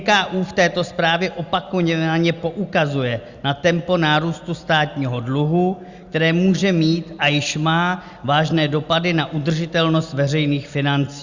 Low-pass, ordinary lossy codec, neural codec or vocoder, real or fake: 7.2 kHz; Opus, 64 kbps; none; real